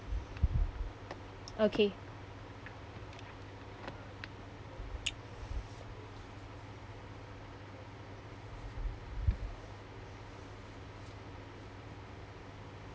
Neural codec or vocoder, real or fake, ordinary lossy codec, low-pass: none; real; none; none